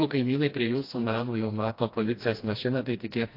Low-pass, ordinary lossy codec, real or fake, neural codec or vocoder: 5.4 kHz; AAC, 32 kbps; fake; codec, 16 kHz, 1 kbps, FreqCodec, smaller model